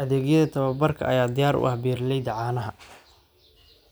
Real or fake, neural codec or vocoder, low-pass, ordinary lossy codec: real; none; none; none